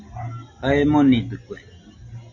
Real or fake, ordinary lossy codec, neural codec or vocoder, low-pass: real; AAC, 48 kbps; none; 7.2 kHz